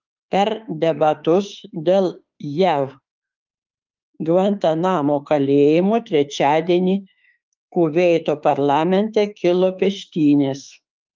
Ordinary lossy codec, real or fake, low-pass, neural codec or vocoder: Opus, 24 kbps; fake; 7.2 kHz; autoencoder, 48 kHz, 32 numbers a frame, DAC-VAE, trained on Japanese speech